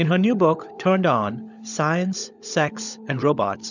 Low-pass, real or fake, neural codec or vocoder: 7.2 kHz; fake; codec, 16 kHz, 16 kbps, FunCodec, trained on LibriTTS, 50 frames a second